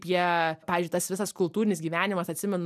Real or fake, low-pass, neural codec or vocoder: real; 14.4 kHz; none